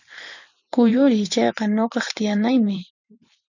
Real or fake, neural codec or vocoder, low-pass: fake; vocoder, 44.1 kHz, 128 mel bands every 512 samples, BigVGAN v2; 7.2 kHz